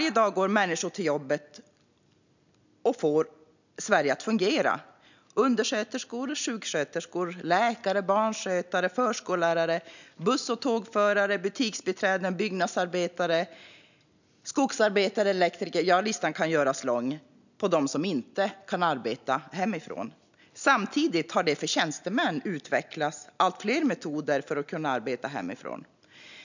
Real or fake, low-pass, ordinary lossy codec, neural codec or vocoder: real; 7.2 kHz; none; none